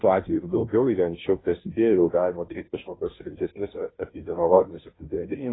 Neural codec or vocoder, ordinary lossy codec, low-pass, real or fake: codec, 16 kHz, 0.5 kbps, FunCodec, trained on Chinese and English, 25 frames a second; AAC, 16 kbps; 7.2 kHz; fake